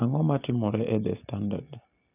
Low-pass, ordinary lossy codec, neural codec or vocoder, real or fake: 3.6 kHz; none; none; real